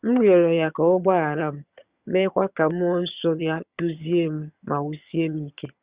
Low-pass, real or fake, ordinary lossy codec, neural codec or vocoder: 3.6 kHz; fake; Opus, 64 kbps; vocoder, 22.05 kHz, 80 mel bands, HiFi-GAN